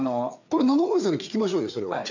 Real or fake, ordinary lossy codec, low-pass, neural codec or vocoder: fake; none; 7.2 kHz; codec, 16 kHz, 4 kbps, FreqCodec, larger model